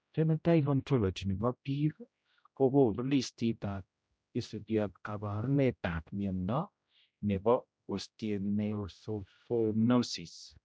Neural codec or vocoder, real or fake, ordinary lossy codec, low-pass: codec, 16 kHz, 0.5 kbps, X-Codec, HuBERT features, trained on general audio; fake; none; none